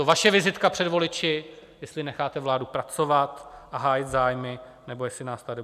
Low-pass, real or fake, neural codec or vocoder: 14.4 kHz; real; none